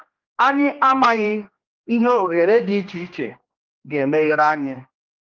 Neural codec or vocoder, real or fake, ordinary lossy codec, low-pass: codec, 16 kHz, 1 kbps, X-Codec, HuBERT features, trained on general audio; fake; Opus, 32 kbps; 7.2 kHz